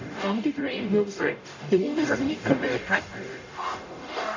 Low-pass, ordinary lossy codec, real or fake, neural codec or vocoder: 7.2 kHz; AAC, 48 kbps; fake; codec, 44.1 kHz, 0.9 kbps, DAC